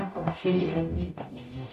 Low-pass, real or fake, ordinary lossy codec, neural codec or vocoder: 14.4 kHz; fake; none; codec, 44.1 kHz, 0.9 kbps, DAC